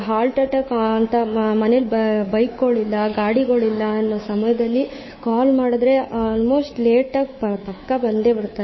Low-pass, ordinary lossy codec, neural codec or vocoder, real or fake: 7.2 kHz; MP3, 24 kbps; codec, 24 kHz, 3.1 kbps, DualCodec; fake